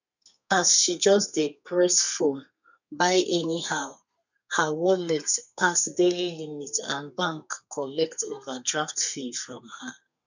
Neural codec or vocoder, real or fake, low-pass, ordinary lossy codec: codec, 32 kHz, 1.9 kbps, SNAC; fake; 7.2 kHz; none